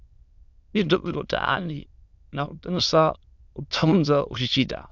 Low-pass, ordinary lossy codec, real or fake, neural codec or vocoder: 7.2 kHz; Opus, 64 kbps; fake; autoencoder, 22.05 kHz, a latent of 192 numbers a frame, VITS, trained on many speakers